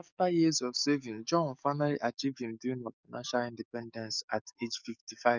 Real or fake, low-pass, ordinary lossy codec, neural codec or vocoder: fake; 7.2 kHz; none; codec, 44.1 kHz, 7.8 kbps, DAC